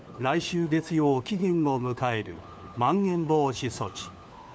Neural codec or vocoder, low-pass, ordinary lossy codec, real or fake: codec, 16 kHz, 4 kbps, FunCodec, trained on LibriTTS, 50 frames a second; none; none; fake